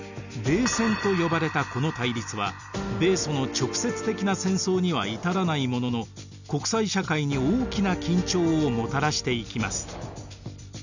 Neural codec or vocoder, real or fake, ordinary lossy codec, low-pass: none; real; none; 7.2 kHz